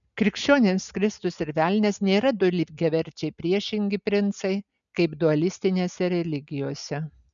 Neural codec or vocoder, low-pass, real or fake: none; 7.2 kHz; real